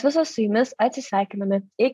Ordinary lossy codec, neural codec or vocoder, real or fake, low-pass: AAC, 96 kbps; none; real; 14.4 kHz